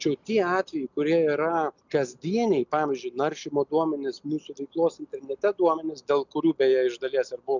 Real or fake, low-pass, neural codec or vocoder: real; 7.2 kHz; none